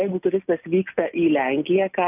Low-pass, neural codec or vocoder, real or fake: 3.6 kHz; none; real